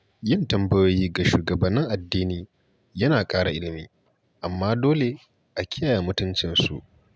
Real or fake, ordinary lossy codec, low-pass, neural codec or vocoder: real; none; none; none